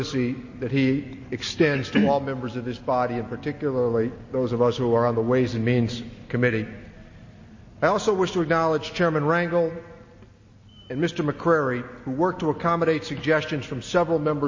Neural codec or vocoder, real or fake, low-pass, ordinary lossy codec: none; real; 7.2 kHz; MP3, 32 kbps